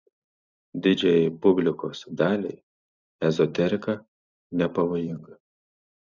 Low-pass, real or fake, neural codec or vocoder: 7.2 kHz; fake; vocoder, 44.1 kHz, 128 mel bands every 256 samples, BigVGAN v2